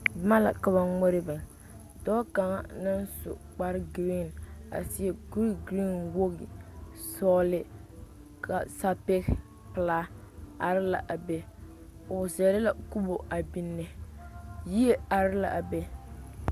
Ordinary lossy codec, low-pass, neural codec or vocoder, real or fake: Opus, 64 kbps; 14.4 kHz; none; real